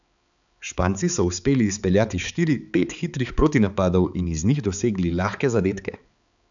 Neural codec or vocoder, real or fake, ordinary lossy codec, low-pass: codec, 16 kHz, 4 kbps, X-Codec, HuBERT features, trained on balanced general audio; fake; none; 7.2 kHz